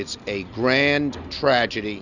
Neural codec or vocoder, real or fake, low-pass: none; real; 7.2 kHz